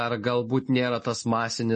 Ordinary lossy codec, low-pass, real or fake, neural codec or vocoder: MP3, 32 kbps; 10.8 kHz; real; none